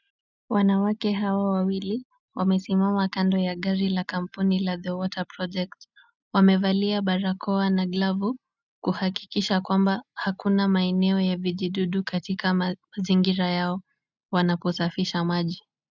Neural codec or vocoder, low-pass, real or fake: none; 7.2 kHz; real